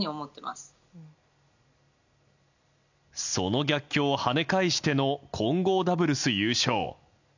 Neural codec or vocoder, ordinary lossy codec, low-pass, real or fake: none; none; 7.2 kHz; real